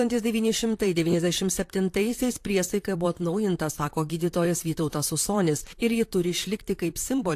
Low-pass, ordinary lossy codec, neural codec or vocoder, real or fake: 14.4 kHz; AAC, 64 kbps; vocoder, 44.1 kHz, 128 mel bands, Pupu-Vocoder; fake